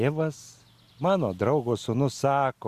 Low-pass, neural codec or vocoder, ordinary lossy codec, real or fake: 14.4 kHz; none; Opus, 64 kbps; real